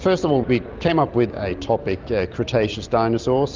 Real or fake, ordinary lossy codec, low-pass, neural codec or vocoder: real; Opus, 24 kbps; 7.2 kHz; none